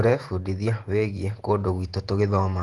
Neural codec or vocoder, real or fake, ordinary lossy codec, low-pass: vocoder, 48 kHz, 128 mel bands, Vocos; fake; Opus, 24 kbps; 10.8 kHz